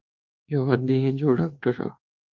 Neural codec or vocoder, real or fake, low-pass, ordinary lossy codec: codec, 24 kHz, 1.2 kbps, DualCodec; fake; 7.2 kHz; Opus, 32 kbps